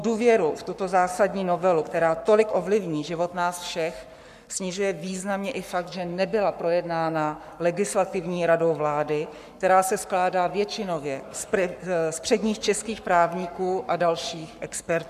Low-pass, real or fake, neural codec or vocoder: 14.4 kHz; fake; codec, 44.1 kHz, 7.8 kbps, Pupu-Codec